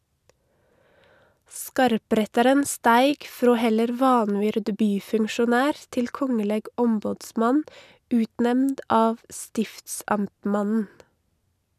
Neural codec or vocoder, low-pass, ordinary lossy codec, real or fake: none; 14.4 kHz; none; real